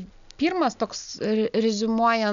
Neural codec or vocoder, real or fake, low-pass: none; real; 7.2 kHz